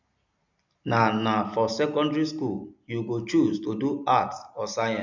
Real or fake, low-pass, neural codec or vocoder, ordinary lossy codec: real; 7.2 kHz; none; none